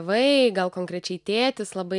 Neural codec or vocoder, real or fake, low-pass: none; real; 10.8 kHz